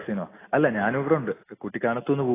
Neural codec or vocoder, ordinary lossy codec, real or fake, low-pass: none; AAC, 16 kbps; real; 3.6 kHz